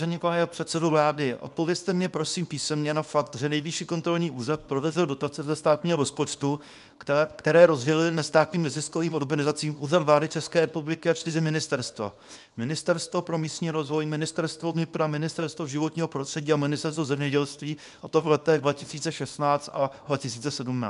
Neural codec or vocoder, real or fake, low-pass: codec, 24 kHz, 0.9 kbps, WavTokenizer, small release; fake; 10.8 kHz